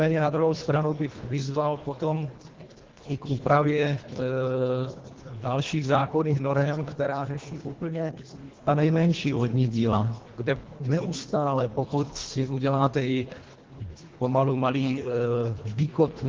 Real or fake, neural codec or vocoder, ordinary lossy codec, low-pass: fake; codec, 24 kHz, 1.5 kbps, HILCodec; Opus, 16 kbps; 7.2 kHz